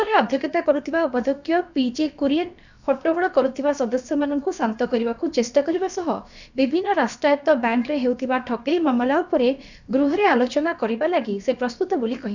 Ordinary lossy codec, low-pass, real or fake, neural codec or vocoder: none; 7.2 kHz; fake; codec, 16 kHz, about 1 kbps, DyCAST, with the encoder's durations